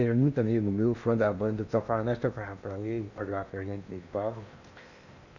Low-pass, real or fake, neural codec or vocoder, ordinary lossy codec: 7.2 kHz; fake; codec, 16 kHz in and 24 kHz out, 0.8 kbps, FocalCodec, streaming, 65536 codes; none